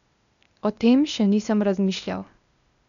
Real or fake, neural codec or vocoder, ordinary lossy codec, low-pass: fake; codec, 16 kHz, 0.8 kbps, ZipCodec; none; 7.2 kHz